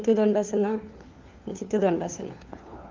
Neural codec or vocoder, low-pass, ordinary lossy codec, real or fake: codec, 16 kHz, 4 kbps, FunCodec, trained on LibriTTS, 50 frames a second; 7.2 kHz; Opus, 32 kbps; fake